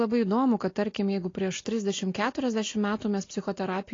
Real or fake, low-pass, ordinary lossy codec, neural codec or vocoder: real; 7.2 kHz; AAC, 32 kbps; none